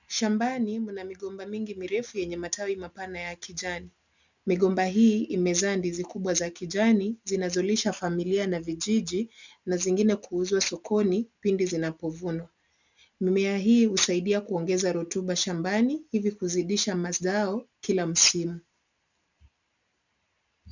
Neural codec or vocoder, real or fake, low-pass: none; real; 7.2 kHz